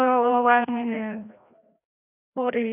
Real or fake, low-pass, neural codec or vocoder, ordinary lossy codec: fake; 3.6 kHz; codec, 16 kHz, 1 kbps, FreqCodec, larger model; none